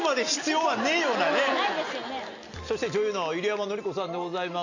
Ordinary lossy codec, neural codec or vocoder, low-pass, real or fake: AAC, 48 kbps; none; 7.2 kHz; real